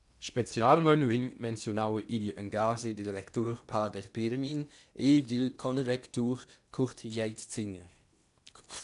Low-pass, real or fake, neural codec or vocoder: 10.8 kHz; fake; codec, 16 kHz in and 24 kHz out, 0.8 kbps, FocalCodec, streaming, 65536 codes